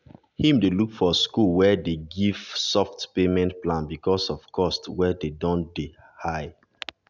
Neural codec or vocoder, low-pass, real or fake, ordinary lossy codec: none; 7.2 kHz; real; none